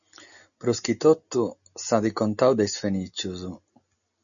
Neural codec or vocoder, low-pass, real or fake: none; 7.2 kHz; real